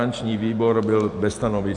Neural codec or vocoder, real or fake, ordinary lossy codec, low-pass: vocoder, 44.1 kHz, 128 mel bands every 512 samples, BigVGAN v2; fake; Opus, 64 kbps; 10.8 kHz